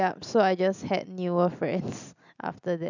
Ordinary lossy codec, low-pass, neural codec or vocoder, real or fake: none; 7.2 kHz; none; real